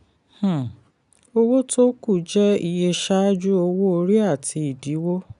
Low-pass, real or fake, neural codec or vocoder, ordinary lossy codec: 10.8 kHz; real; none; none